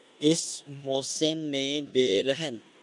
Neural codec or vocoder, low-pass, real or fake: codec, 16 kHz in and 24 kHz out, 0.9 kbps, LongCat-Audio-Codec, four codebook decoder; 10.8 kHz; fake